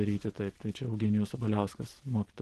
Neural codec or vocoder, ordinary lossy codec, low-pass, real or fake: none; Opus, 16 kbps; 10.8 kHz; real